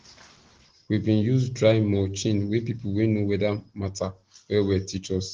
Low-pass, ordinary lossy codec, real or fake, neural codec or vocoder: 7.2 kHz; Opus, 16 kbps; real; none